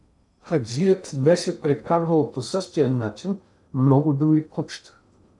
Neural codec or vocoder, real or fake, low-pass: codec, 16 kHz in and 24 kHz out, 0.6 kbps, FocalCodec, streaming, 2048 codes; fake; 10.8 kHz